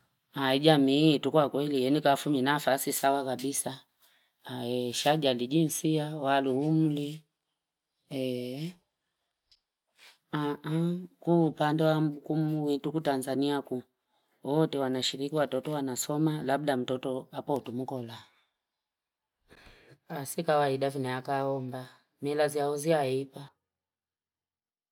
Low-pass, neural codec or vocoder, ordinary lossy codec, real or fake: 19.8 kHz; none; none; real